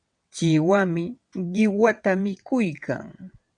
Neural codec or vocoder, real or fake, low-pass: vocoder, 22.05 kHz, 80 mel bands, WaveNeXt; fake; 9.9 kHz